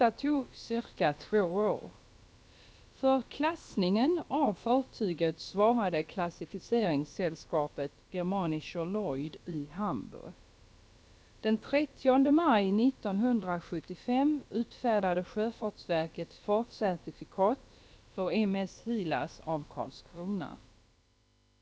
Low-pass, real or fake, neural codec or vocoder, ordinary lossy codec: none; fake; codec, 16 kHz, about 1 kbps, DyCAST, with the encoder's durations; none